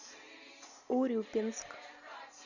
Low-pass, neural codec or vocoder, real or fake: 7.2 kHz; none; real